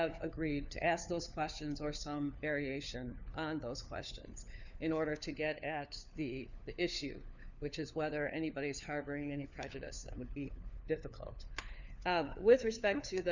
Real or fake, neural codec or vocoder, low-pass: fake; codec, 16 kHz, 4 kbps, FunCodec, trained on LibriTTS, 50 frames a second; 7.2 kHz